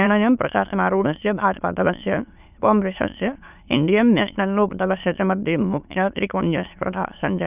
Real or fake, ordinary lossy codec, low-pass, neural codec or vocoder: fake; none; 3.6 kHz; autoencoder, 22.05 kHz, a latent of 192 numbers a frame, VITS, trained on many speakers